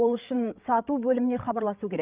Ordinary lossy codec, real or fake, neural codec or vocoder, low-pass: Opus, 24 kbps; fake; codec, 16 kHz, 8 kbps, FreqCodec, larger model; 3.6 kHz